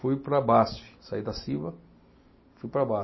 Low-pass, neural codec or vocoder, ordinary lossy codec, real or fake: 7.2 kHz; none; MP3, 24 kbps; real